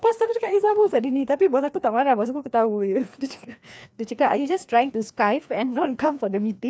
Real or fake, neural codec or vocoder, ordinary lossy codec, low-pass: fake; codec, 16 kHz, 2 kbps, FreqCodec, larger model; none; none